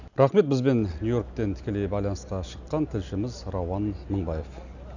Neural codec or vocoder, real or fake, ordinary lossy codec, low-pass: none; real; none; 7.2 kHz